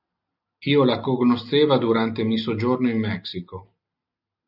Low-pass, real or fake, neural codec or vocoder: 5.4 kHz; real; none